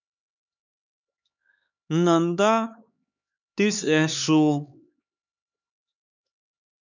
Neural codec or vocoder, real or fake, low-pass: codec, 16 kHz, 4 kbps, X-Codec, HuBERT features, trained on LibriSpeech; fake; 7.2 kHz